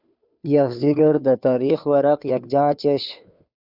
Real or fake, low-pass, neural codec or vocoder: fake; 5.4 kHz; codec, 16 kHz, 2 kbps, FunCodec, trained on Chinese and English, 25 frames a second